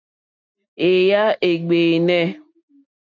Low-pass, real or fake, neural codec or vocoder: 7.2 kHz; real; none